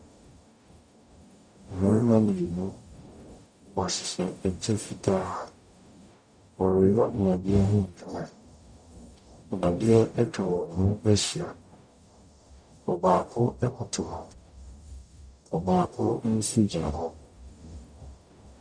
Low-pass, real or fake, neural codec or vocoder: 9.9 kHz; fake; codec, 44.1 kHz, 0.9 kbps, DAC